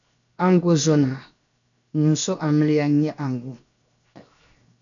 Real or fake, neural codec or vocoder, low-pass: fake; codec, 16 kHz, 0.7 kbps, FocalCodec; 7.2 kHz